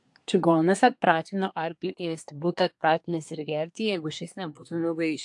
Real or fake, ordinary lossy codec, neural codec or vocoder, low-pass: fake; AAC, 64 kbps; codec, 24 kHz, 1 kbps, SNAC; 10.8 kHz